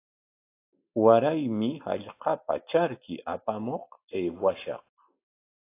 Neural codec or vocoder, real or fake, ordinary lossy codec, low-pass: none; real; AAC, 24 kbps; 3.6 kHz